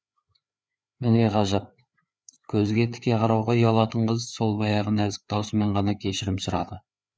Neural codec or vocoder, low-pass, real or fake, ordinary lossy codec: codec, 16 kHz, 4 kbps, FreqCodec, larger model; none; fake; none